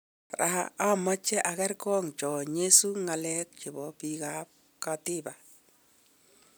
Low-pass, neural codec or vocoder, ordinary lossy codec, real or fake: none; none; none; real